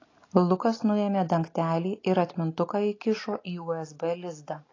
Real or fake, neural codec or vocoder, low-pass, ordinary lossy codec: real; none; 7.2 kHz; AAC, 32 kbps